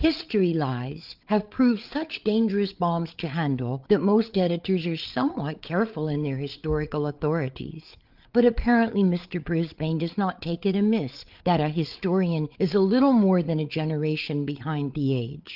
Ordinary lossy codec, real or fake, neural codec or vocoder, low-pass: Opus, 32 kbps; fake; codec, 16 kHz, 8 kbps, FreqCodec, larger model; 5.4 kHz